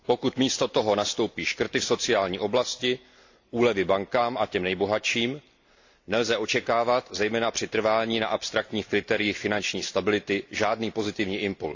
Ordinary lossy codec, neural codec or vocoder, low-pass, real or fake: AAC, 48 kbps; none; 7.2 kHz; real